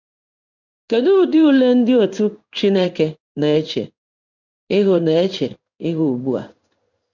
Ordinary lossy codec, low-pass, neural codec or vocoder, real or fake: none; 7.2 kHz; codec, 16 kHz in and 24 kHz out, 1 kbps, XY-Tokenizer; fake